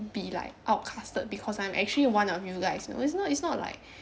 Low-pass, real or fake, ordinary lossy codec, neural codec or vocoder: none; real; none; none